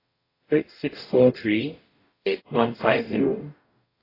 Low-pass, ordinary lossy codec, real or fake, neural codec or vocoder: 5.4 kHz; AAC, 24 kbps; fake; codec, 44.1 kHz, 0.9 kbps, DAC